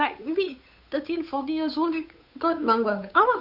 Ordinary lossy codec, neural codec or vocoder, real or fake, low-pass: none; codec, 16 kHz, 8 kbps, FunCodec, trained on Chinese and English, 25 frames a second; fake; 5.4 kHz